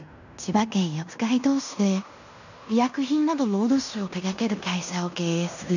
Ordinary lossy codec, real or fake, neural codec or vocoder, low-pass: none; fake; codec, 16 kHz in and 24 kHz out, 0.9 kbps, LongCat-Audio-Codec, fine tuned four codebook decoder; 7.2 kHz